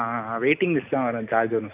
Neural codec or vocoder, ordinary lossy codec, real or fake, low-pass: none; AAC, 32 kbps; real; 3.6 kHz